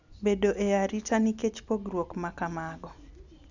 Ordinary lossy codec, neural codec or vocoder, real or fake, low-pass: none; none; real; 7.2 kHz